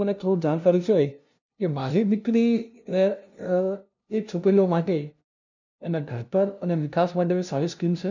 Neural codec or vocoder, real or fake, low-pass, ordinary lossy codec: codec, 16 kHz, 0.5 kbps, FunCodec, trained on LibriTTS, 25 frames a second; fake; 7.2 kHz; none